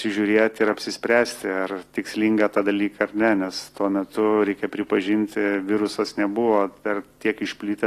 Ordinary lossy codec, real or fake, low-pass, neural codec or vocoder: AAC, 48 kbps; real; 14.4 kHz; none